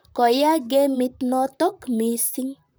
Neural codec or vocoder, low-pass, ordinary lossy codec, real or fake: vocoder, 44.1 kHz, 128 mel bands, Pupu-Vocoder; none; none; fake